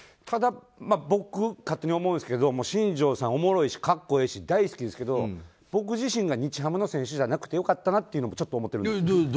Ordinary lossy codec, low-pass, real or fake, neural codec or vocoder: none; none; real; none